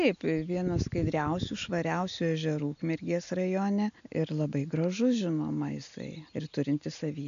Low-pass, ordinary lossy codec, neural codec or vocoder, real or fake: 7.2 kHz; AAC, 96 kbps; none; real